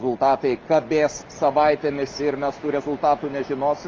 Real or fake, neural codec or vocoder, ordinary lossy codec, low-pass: real; none; Opus, 24 kbps; 7.2 kHz